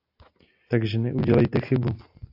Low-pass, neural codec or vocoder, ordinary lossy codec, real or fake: 5.4 kHz; none; AAC, 48 kbps; real